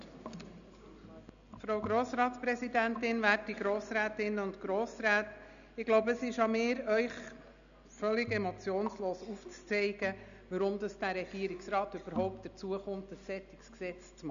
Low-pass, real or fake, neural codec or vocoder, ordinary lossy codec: 7.2 kHz; real; none; none